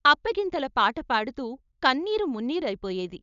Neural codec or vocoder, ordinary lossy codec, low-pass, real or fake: codec, 16 kHz, 4.8 kbps, FACodec; none; 7.2 kHz; fake